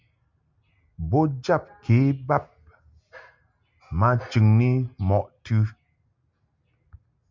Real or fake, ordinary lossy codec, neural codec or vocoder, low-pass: real; MP3, 64 kbps; none; 7.2 kHz